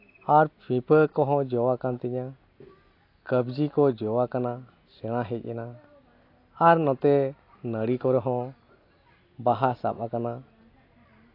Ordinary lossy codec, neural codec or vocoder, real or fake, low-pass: AAC, 32 kbps; none; real; 5.4 kHz